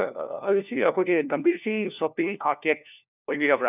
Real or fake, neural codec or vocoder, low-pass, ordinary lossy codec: fake; codec, 16 kHz, 1 kbps, FunCodec, trained on LibriTTS, 50 frames a second; 3.6 kHz; none